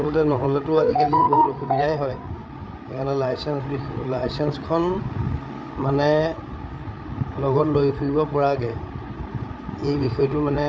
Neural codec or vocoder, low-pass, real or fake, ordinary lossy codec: codec, 16 kHz, 8 kbps, FreqCodec, larger model; none; fake; none